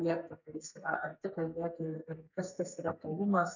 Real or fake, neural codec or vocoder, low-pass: fake; codec, 32 kHz, 1.9 kbps, SNAC; 7.2 kHz